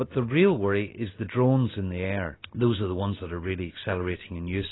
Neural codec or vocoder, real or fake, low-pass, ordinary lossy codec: none; real; 7.2 kHz; AAC, 16 kbps